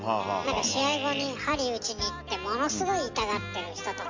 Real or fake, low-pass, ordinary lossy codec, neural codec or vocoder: real; 7.2 kHz; MP3, 64 kbps; none